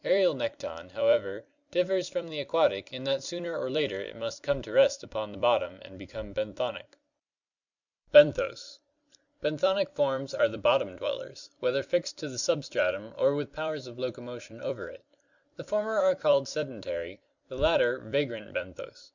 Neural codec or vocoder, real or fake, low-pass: vocoder, 44.1 kHz, 128 mel bands every 256 samples, BigVGAN v2; fake; 7.2 kHz